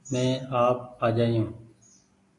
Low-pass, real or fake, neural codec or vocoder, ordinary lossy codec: 10.8 kHz; real; none; AAC, 48 kbps